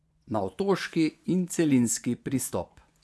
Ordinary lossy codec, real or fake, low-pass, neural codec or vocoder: none; fake; none; vocoder, 24 kHz, 100 mel bands, Vocos